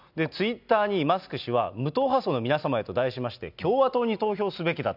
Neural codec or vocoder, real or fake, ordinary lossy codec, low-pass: none; real; none; 5.4 kHz